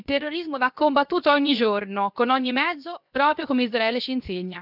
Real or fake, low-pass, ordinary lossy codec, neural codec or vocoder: fake; 5.4 kHz; none; codec, 16 kHz, 0.7 kbps, FocalCodec